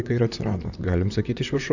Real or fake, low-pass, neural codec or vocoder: fake; 7.2 kHz; vocoder, 44.1 kHz, 128 mel bands, Pupu-Vocoder